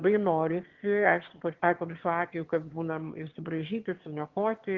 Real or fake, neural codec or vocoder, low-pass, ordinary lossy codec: fake; autoencoder, 22.05 kHz, a latent of 192 numbers a frame, VITS, trained on one speaker; 7.2 kHz; Opus, 16 kbps